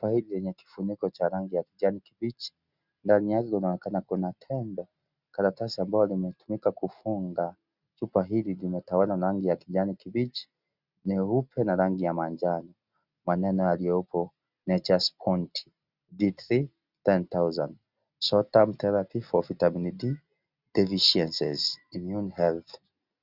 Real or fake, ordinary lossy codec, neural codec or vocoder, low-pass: real; Opus, 64 kbps; none; 5.4 kHz